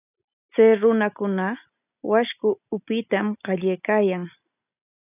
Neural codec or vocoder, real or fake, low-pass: none; real; 3.6 kHz